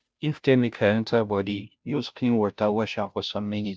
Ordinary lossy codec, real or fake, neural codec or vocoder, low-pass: none; fake; codec, 16 kHz, 0.5 kbps, FunCodec, trained on Chinese and English, 25 frames a second; none